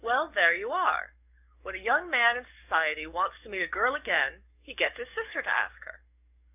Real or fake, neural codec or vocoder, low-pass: fake; codec, 44.1 kHz, 7.8 kbps, DAC; 3.6 kHz